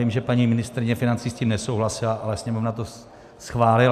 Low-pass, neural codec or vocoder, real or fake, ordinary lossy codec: 14.4 kHz; none; real; AAC, 96 kbps